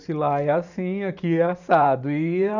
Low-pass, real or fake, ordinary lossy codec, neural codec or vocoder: 7.2 kHz; fake; none; vocoder, 44.1 kHz, 128 mel bands every 512 samples, BigVGAN v2